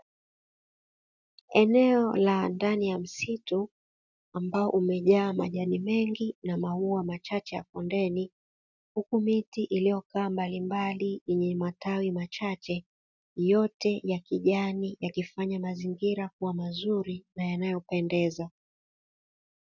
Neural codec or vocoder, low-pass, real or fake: none; 7.2 kHz; real